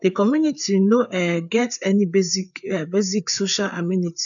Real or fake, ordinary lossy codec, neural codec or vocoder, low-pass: fake; none; codec, 16 kHz, 4 kbps, FreqCodec, larger model; 7.2 kHz